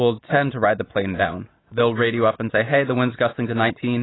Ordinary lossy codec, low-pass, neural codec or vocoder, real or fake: AAC, 16 kbps; 7.2 kHz; none; real